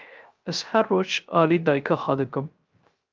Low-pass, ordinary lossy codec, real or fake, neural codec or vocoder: 7.2 kHz; Opus, 24 kbps; fake; codec, 16 kHz, 0.3 kbps, FocalCodec